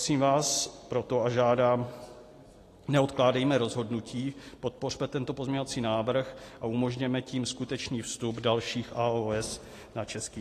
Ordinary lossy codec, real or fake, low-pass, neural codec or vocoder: AAC, 48 kbps; real; 14.4 kHz; none